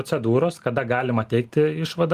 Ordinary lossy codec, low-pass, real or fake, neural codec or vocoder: Opus, 24 kbps; 14.4 kHz; real; none